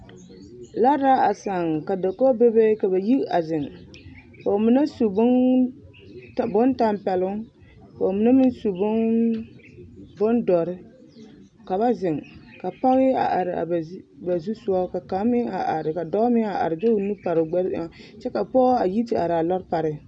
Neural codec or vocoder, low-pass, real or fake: none; 9.9 kHz; real